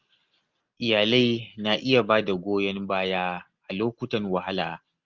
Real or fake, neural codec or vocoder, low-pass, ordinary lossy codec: real; none; 7.2 kHz; Opus, 24 kbps